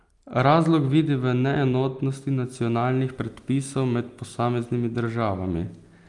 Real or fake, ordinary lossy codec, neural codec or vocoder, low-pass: real; Opus, 32 kbps; none; 10.8 kHz